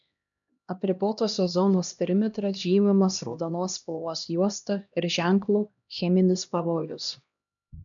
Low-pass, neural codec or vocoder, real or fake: 7.2 kHz; codec, 16 kHz, 1 kbps, X-Codec, HuBERT features, trained on LibriSpeech; fake